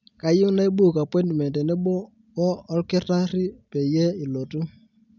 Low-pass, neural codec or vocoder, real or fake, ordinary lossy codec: 7.2 kHz; none; real; none